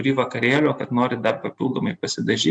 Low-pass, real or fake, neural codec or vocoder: 9.9 kHz; real; none